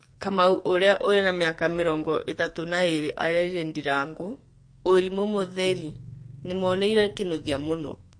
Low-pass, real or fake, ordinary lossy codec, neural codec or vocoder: 9.9 kHz; fake; MP3, 48 kbps; codec, 44.1 kHz, 2.6 kbps, SNAC